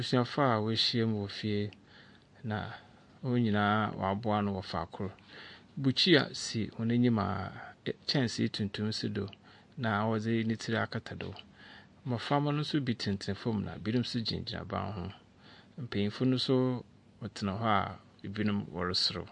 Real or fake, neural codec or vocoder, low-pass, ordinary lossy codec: real; none; 10.8 kHz; MP3, 48 kbps